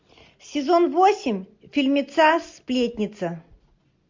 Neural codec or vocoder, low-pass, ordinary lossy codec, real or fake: none; 7.2 kHz; MP3, 48 kbps; real